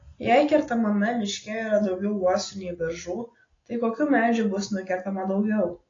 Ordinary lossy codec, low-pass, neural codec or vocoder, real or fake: AAC, 32 kbps; 7.2 kHz; none; real